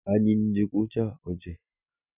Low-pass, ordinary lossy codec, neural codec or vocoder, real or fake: 3.6 kHz; none; none; real